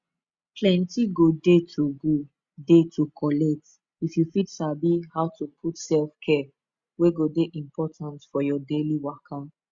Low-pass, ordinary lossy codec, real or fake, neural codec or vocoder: 7.2 kHz; Opus, 64 kbps; real; none